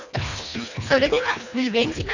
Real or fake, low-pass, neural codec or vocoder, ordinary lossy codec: fake; 7.2 kHz; codec, 24 kHz, 1.5 kbps, HILCodec; none